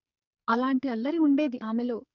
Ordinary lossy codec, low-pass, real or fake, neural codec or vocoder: none; 7.2 kHz; fake; codec, 44.1 kHz, 2.6 kbps, SNAC